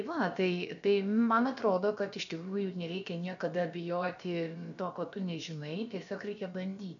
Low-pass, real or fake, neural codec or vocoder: 7.2 kHz; fake; codec, 16 kHz, about 1 kbps, DyCAST, with the encoder's durations